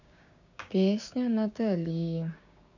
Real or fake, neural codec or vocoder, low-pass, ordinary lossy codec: fake; codec, 16 kHz, 6 kbps, DAC; 7.2 kHz; none